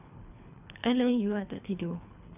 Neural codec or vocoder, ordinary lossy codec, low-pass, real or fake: codec, 24 kHz, 3 kbps, HILCodec; none; 3.6 kHz; fake